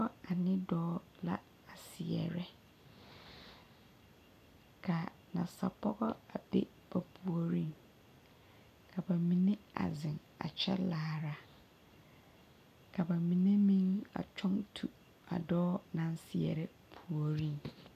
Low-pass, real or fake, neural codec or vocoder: 14.4 kHz; real; none